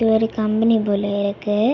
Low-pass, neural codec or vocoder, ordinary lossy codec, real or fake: 7.2 kHz; none; none; real